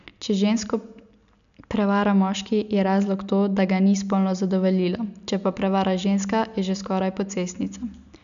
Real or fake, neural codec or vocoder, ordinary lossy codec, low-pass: real; none; none; 7.2 kHz